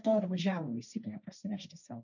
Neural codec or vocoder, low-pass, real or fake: codec, 16 kHz, 1.1 kbps, Voila-Tokenizer; 7.2 kHz; fake